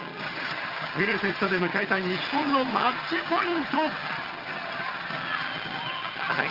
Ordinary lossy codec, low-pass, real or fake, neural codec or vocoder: Opus, 24 kbps; 5.4 kHz; fake; vocoder, 22.05 kHz, 80 mel bands, HiFi-GAN